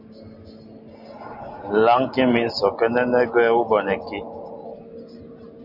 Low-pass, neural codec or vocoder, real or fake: 5.4 kHz; none; real